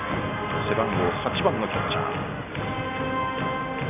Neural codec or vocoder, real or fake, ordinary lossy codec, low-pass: none; real; none; 3.6 kHz